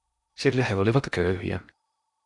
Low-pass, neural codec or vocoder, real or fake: 10.8 kHz; codec, 16 kHz in and 24 kHz out, 0.8 kbps, FocalCodec, streaming, 65536 codes; fake